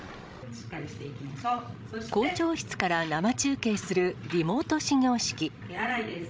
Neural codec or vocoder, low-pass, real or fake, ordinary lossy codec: codec, 16 kHz, 16 kbps, FreqCodec, larger model; none; fake; none